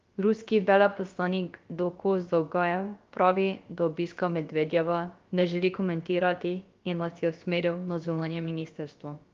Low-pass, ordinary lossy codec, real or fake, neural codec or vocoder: 7.2 kHz; Opus, 32 kbps; fake; codec, 16 kHz, about 1 kbps, DyCAST, with the encoder's durations